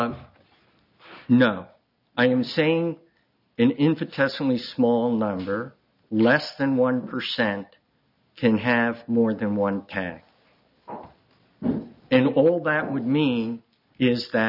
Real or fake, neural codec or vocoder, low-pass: real; none; 5.4 kHz